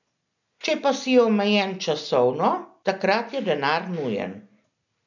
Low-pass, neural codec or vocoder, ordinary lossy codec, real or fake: 7.2 kHz; none; none; real